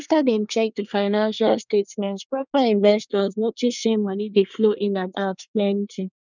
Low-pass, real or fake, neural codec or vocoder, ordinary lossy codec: 7.2 kHz; fake; codec, 24 kHz, 1 kbps, SNAC; none